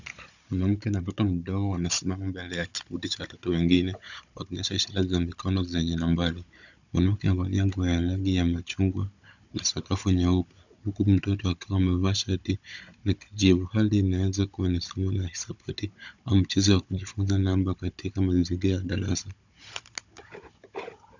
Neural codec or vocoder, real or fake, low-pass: codec, 16 kHz, 16 kbps, FunCodec, trained on Chinese and English, 50 frames a second; fake; 7.2 kHz